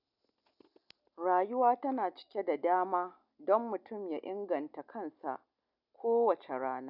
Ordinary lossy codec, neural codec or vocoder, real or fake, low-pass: none; none; real; 5.4 kHz